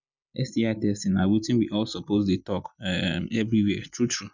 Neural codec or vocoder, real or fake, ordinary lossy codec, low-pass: none; real; none; 7.2 kHz